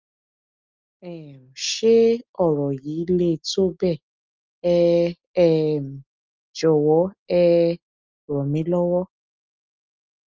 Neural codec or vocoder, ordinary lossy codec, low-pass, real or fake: none; Opus, 24 kbps; 7.2 kHz; real